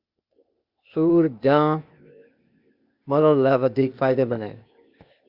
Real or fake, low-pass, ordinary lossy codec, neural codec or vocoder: fake; 5.4 kHz; Opus, 64 kbps; codec, 16 kHz, 0.8 kbps, ZipCodec